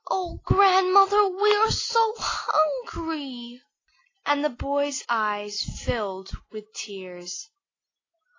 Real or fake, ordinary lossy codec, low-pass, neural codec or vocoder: real; AAC, 32 kbps; 7.2 kHz; none